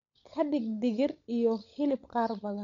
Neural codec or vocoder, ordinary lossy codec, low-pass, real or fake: codec, 16 kHz, 16 kbps, FunCodec, trained on LibriTTS, 50 frames a second; none; 7.2 kHz; fake